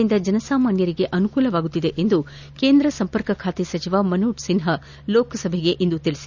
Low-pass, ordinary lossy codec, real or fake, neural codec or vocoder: 7.2 kHz; none; real; none